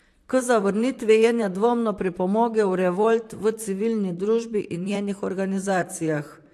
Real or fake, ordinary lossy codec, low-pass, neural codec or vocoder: fake; AAC, 64 kbps; 14.4 kHz; vocoder, 44.1 kHz, 128 mel bands, Pupu-Vocoder